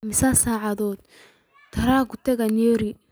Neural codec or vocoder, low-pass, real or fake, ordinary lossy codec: none; none; real; none